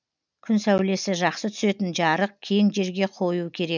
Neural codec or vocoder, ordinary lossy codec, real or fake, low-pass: none; none; real; 7.2 kHz